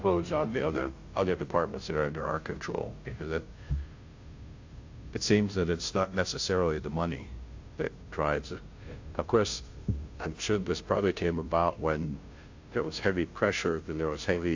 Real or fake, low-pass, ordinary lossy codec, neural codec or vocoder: fake; 7.2 kHz; AAC, 48 kbps; codec, 16 kHz, 0.5 kbps, FunCodec, trained on Chinese and English, 25 frames a second